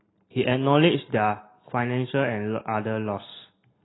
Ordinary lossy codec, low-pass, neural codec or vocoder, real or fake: AAC, 16 kbps; 7.2 kHz; none; real